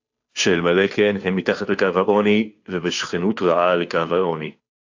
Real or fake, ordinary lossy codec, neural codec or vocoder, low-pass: fake; AAC, 48 kbps; codec, 16 kHz, 2 kbps, FunCodec, trained on Chinese and English, 25 frames a second; 7.2 kHz